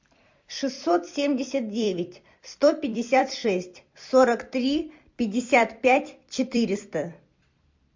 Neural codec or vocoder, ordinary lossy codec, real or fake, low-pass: vocoder, 44.1 kHz, 128 mel bands every 256 samples, BigVGAN v2; MP3, 48 kbps; fake; 7.2 kHz